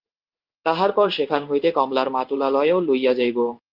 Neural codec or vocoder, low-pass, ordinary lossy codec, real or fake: codec, 16 kHz in and 24 kHz out, 1 kbps, XY-Tokenizer; 5.4 kHz; Opus, 32 kbps; fake